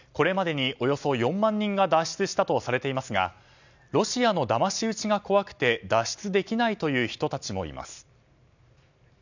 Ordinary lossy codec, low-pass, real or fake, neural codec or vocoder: none; 7.2 kHz; real; none